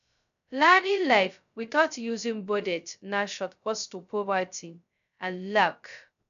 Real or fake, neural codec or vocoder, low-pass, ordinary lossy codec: fake; codec, 16 kHz, 0.2 kbps, FocalCodec; 7.2 kHz; MP3, 96 kbps